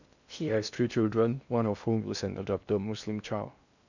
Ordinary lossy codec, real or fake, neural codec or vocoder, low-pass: none; fake; codec, 16 kHz in and 24 kHz out, 0.6 kbps, FocalCodec, streaming, 2048 codes; 7.2 kHz